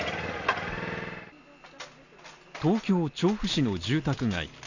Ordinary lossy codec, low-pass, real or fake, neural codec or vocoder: none; 7.2 kHz; real; none